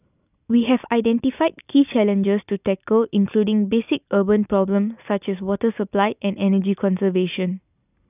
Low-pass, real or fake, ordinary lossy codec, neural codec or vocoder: 3.6 kHz; real; none; none